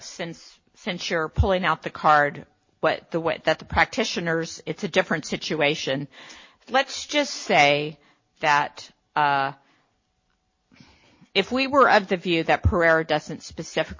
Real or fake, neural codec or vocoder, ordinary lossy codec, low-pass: real; none; MP3, 32 kbps; 7.2 kHz